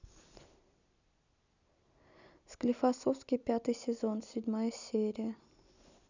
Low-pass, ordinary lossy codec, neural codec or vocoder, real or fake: 7.2 kHz; none; none; real